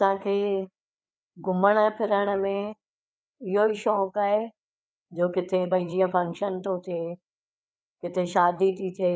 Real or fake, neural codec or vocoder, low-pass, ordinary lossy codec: fake; codec, 16 kHz, 4 kbps, FreqCodec, larger model; none; none